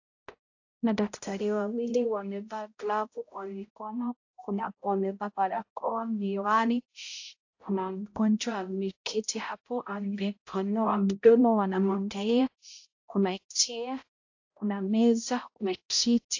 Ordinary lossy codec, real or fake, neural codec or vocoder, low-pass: AAC, 48 kbps; fake; codec, 16 kHz, 0.5 kbps, X-Codec, HuBERT features, trained on balanced general audio; 7.2 kHz